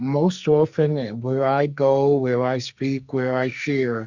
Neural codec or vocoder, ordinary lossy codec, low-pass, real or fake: codec, 44.1 kHz, 2.6 kbps, SNAC; Opus, 64 kbps; 7.2 kHz; fake